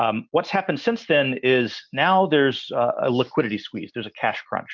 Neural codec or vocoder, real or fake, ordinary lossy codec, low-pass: none; real; MP3, 64 kbps; 7.2 kHz